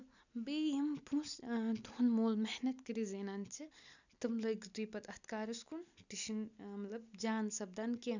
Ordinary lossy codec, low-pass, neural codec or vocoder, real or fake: none; 7.2 kHz; none; real